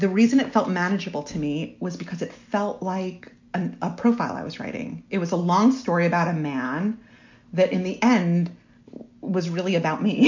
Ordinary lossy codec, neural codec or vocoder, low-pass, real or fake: MP3, 48 kbps; none; 7.2 kHz; real